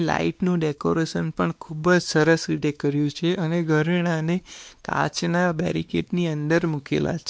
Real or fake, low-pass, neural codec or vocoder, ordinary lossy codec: fake; none; codec, 16 kHz, 2 kbps, X-Codec, WavLM features, trained on Multilingual LibriSpeech; none